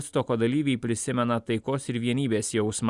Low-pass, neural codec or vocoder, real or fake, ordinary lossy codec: 10.8 kHz; none; real; Opus, 64 kbps